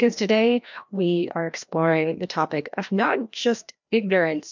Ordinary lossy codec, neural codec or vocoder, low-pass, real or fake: MP3, 64 kbps; codec, 16 kHz, 1 kbps, FreqCodec, larger model; 7.2 kHz; fake